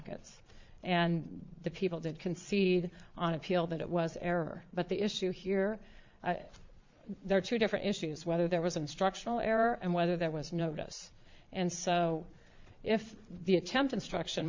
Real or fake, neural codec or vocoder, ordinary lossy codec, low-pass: fake; vocoder, 22.05 kHz, 80 mel bands, Vocos; MP3, 64 kbps; 7.2 kHz